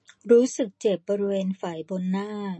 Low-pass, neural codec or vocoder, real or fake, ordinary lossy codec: 10.8 kHz; none; real; MP3, 32 kbps